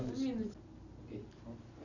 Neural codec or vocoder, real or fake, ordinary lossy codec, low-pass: none; real; none; 7.2 kHz